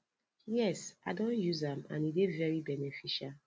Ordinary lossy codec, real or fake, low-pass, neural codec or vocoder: none; real; none; none